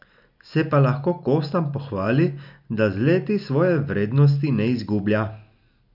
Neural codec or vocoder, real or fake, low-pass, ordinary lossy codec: none; real; 5.4 kHz; none